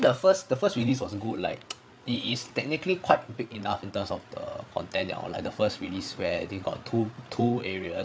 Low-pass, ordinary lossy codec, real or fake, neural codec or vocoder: none; none; fake; codec, 16 kHz, 8 kbps, FreqCodec, larger model